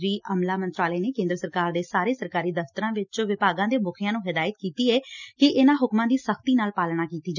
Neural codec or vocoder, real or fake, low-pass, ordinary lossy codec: none; real; 7.2 kHz; none